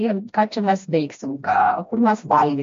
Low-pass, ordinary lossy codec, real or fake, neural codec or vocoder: 7.2 kHz; MP3, 48 kbps; fake; codec, 16 kHz, 1 kbps, FreqCodec, smaller model